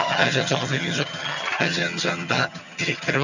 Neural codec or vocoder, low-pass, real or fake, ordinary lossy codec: vocoder, 22.05 kHz, 80 mel bands, HiFi-GAN; 7.2 kHz; fake; none